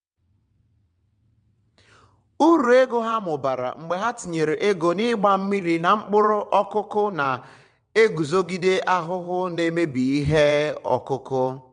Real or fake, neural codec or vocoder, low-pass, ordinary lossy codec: fake; vocoder, 22.05 kHz, 80 mel bands, WaveNeXt; 9.9 kHz; MP3, 64 kbps